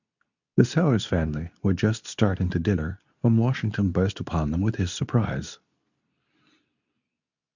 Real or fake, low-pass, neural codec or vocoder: fake; 7.2 kHz; codec, 24 kHz, 0.9 kbps, WavTokenizer, medium speech release version 2